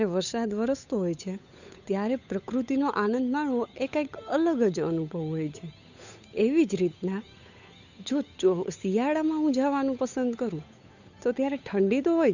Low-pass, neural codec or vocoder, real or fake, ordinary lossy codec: 7.2 kHz; codec, 16 kHz, 8 kbps, FunCodec, trained on Chinese and English, 25 frames a second; fake; none